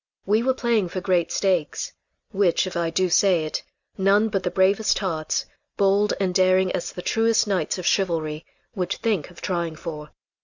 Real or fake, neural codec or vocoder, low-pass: real; none; 7.2 kHz